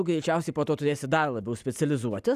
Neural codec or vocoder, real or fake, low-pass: none; real; 14.4 kHz